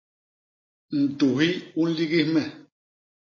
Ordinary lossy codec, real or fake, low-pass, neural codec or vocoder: MP3, 32 kbps; real; 7.2 kHz; none